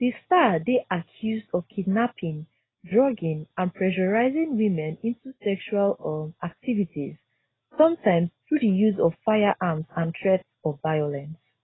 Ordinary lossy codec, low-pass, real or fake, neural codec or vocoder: AAC, 16 kbps; 7.2 kHz; real; none